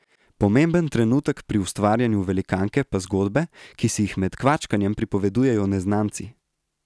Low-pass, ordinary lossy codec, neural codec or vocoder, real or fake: none; none; none; real